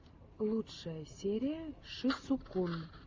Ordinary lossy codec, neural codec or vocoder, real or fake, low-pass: Opus, 32 kbps; none; real; 7.2 kHz